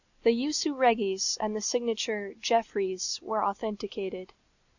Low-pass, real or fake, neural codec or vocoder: 7.2 kHz; real; none